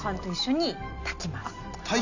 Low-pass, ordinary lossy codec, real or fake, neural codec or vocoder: 7.2 kHz; none; real; none